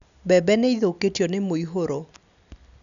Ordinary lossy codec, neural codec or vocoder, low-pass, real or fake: none; none; 7.2 kHz; real